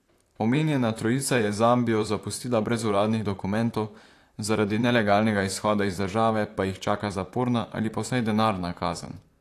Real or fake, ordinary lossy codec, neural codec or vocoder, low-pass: fake; AAC, 64 kbps; vocoder, 44.1 kHz, 128 mel bands, Pupu-Vocoder; 14.4 kHz